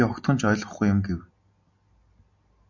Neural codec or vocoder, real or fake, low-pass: none; real; 7.2 kHz